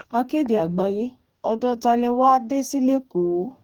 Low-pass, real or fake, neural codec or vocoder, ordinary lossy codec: 19.8 kHz; fake; codec, 44.1 kHz, 2.6 kbps, DAC; Opus, 32 kbps